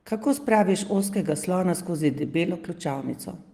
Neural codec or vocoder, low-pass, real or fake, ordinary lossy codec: none; 14.4 kHz; real; Opus, 24 kbps